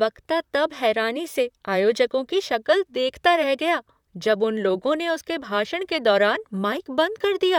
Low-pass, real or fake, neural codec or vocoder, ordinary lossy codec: 19.8 kHz; fake; vocoder, 44.1 kHz, 128 mel bands, Pupu-Vocoder; none